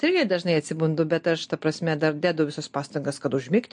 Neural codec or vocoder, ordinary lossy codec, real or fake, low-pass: none; MP3, 48 kbps; real; 9.9 kHz